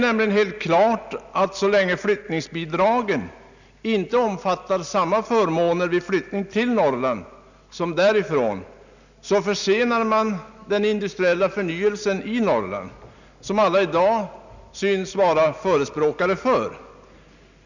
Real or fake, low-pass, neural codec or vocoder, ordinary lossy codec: real; 7.2 kHz; none; none